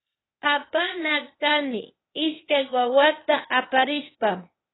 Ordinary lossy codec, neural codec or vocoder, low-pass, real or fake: AAC, 16 kbps; codec, 16 kHz, 0.8 kbps, ZipCodec; 7.2 kHz; fake